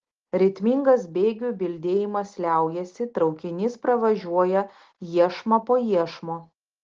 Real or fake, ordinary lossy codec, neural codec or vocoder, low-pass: real; Opus, 16 kbps; none; 7.2 kHz